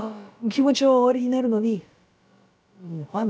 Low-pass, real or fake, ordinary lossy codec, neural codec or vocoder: none; fake; none; codec, 16 kHz, about 1 kbps, DyCAST, with the encoder's durations